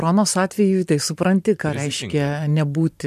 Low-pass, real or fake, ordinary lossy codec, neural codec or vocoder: 14.4 kHz; fake; MP3, 96 kbps; vocoder, 44.1 kHz, 128 mel bands every 512 samples, BigVGAN v2